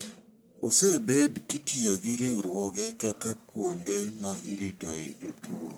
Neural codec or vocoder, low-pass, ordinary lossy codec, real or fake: codec, 44.1 kHz, 1.7 kbps, Pupu-Codec; none; none; fake